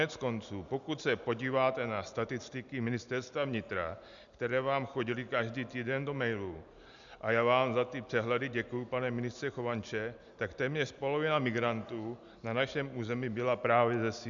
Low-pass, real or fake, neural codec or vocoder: 7.2 kHz; real; none